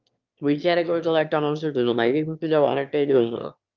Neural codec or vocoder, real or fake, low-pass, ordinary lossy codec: autoencoder, 22.05 kHz, a latent of 192 numbers a frame, VITS, trained on one speaker; fake; 7.2 kHz; Opus, 24 kbps